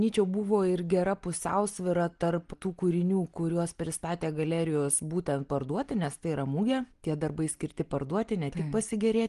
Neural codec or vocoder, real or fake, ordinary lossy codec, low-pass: none; real; Opus, 24 kbps; 10.8 kHz